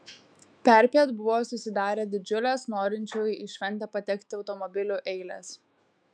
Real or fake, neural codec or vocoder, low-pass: fake; autoencoder, 48 kHz, 128 numbers a frame, DAC-VAE, trained on Japanese speech; 9.9 kHz